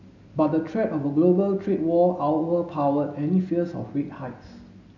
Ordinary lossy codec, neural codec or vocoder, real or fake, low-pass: none; none; real; 7.2 kHz